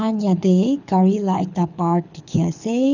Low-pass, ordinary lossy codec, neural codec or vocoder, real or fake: 7.2 kHz; none; codec, 24 kHz, 6 kbps, HILCodec; fake